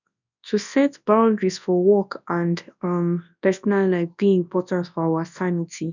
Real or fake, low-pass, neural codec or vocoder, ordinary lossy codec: fake; 7.2 kHz; codec, 24 kHz, 0.9 kbps, WavTokenizer, large speech release; none